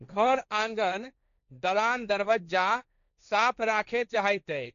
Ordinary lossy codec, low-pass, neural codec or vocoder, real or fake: none; 7.2 kHz; codec, 16 kHz, 1.1 kbps, Voila-Tokenizer; fake